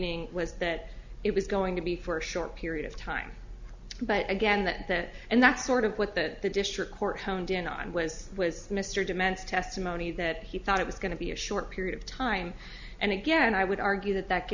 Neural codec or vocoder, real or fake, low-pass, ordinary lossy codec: none; real; 7.2 kHz; Opus, 64 kbps